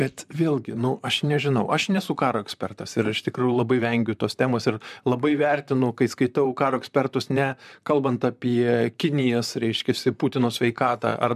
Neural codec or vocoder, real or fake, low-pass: vocoder, 44.1 kHz, 128 mel bands, Pupu-Vocoder; fake; 14.4 kHz